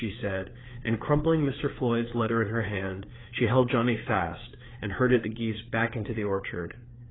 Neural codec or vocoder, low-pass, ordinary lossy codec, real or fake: codec, 16 kHz, 4 kbps, FreqCodec, larger model; 7.2 kHz; AAC, 16 kbps; fake